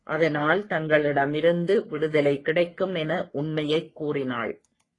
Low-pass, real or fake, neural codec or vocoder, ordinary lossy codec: 10.8 kHz; fake; codec, 44.1 kHz, 3.4 kbps, Pupu-Codec; AAC, 32 kbps